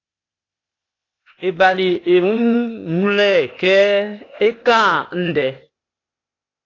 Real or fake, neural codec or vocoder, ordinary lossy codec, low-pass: fake; codec, 16 kHz, 0.8 kbps, ZipCodec; AAC, 32 kbps; 7.2 kHz